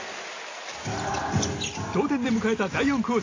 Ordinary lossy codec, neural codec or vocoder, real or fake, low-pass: AAC, 32 kbps; none; real; 7.2 kHz